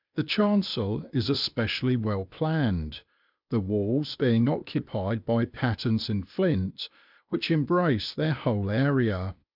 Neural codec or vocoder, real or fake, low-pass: codec, 16 kHz, 0.8 kbps, ZipCodec; fake; 5.4 kHz